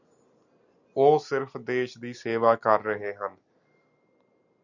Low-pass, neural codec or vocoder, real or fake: 7.2 kHz; none; real